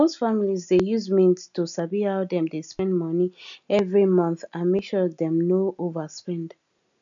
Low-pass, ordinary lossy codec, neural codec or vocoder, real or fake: 7.2 kHz; MP3, 96 kbps; none; real